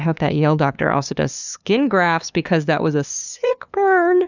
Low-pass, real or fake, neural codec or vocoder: 7.2 kHz; fake; codec, 16 kHz, 2 kbps, FunCodec, trained on LibriTTS, 25 frames a second